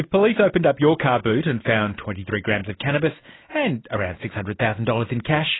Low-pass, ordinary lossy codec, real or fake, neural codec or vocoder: 7.2 kHz; AAC, 16 kbps; real; none